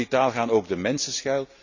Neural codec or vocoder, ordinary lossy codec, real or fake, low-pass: none; none; real; 7.2 kHz